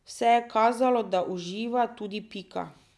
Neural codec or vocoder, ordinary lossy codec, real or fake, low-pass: none; none; real; none